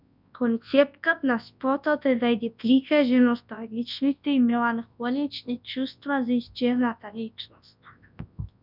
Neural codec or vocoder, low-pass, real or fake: codec, 24 kHz, 0.9 kbps, WavTokenizer, large speech release; 5.4 kHz; fake